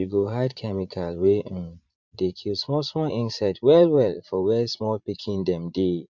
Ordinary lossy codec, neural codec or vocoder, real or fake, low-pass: MP3, 64 kbps; vocoder, 44.1 kHz, 80 mel bands, Vocos; fake; 7.2 kHz